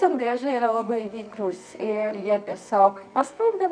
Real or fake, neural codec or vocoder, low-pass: fake; codec, 24 kHz, 0.9 kbps, WavTokenizer, medium music audio release; 9.9 kHz